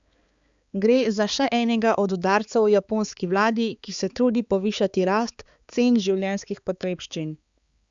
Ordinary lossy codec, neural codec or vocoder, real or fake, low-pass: Opus, 64 kbps; codec, 16 kHz, 4 kbps, X-Codec, HuBERT features, trained on balanced general audio; fake; 7.2 kHz